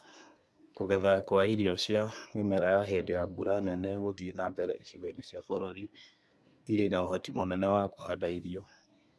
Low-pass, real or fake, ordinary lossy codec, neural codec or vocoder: none; fake; none; codec, 24 kHz, 1 kbps, SNAC